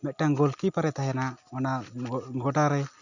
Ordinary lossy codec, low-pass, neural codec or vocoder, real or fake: none; 7.2 kHz; none; real